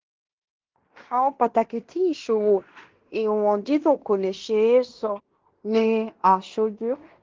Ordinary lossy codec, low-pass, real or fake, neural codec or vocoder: Opus, 16 kbps; 7.2 kHz; fake; codec, 16 kHz in and 24 kHz out, 0.9 kbps, LongCat-Audio-Codec, fine tuned four codebook decoder